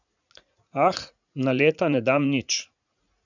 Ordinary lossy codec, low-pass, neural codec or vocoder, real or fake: none; 7.2 kHz; vocoder, 44.1 kHz, 80 mel bands, Vocos; fake